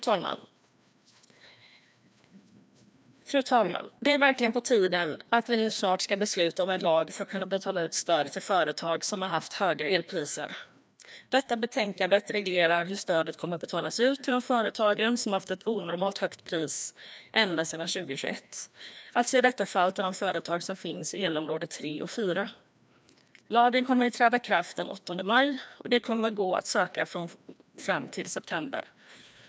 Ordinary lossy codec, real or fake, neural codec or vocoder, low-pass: none; fake; codec, 16 kHz, 1 kbps, FreqCodec, larger model; none